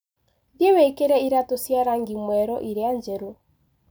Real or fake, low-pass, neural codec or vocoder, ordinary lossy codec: real; none; none; none